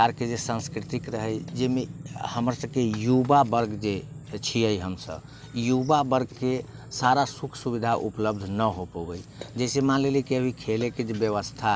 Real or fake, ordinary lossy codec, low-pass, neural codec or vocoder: real; none; none; none